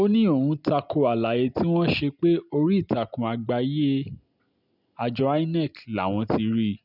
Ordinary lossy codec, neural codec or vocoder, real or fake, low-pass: none; none; real; 5.4 kHz